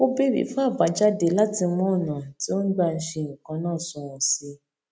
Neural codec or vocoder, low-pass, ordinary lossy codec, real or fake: none; none; none; real